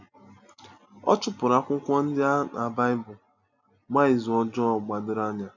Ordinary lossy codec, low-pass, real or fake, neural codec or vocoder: none; 7.2 kHz; real; none